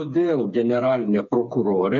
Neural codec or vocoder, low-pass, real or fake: codec, 16 kHz, 4 kbps, FreqCodec, smaller model; 7.2 kHz; fake